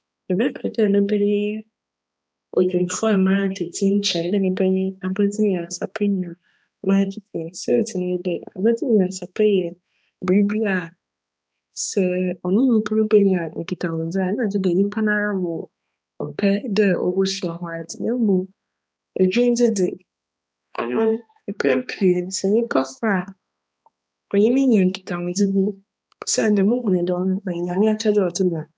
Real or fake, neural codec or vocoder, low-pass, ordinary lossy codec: fake; codec, 16 kHz, 2 kbps, X-Codec, HuBERT features, trained on balanced general audio; none; none